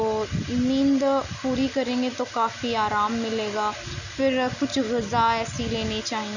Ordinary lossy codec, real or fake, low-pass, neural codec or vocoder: none; real; 7.2 kHz; none